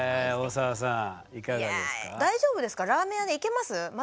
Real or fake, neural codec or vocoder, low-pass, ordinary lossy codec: real; none; none; none